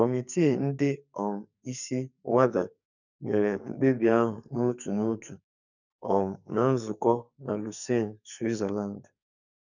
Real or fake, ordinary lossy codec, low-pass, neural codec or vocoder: fake; none; 7.2 kHz; codec, 44.1 kHz, 2.6 kbps, SNAC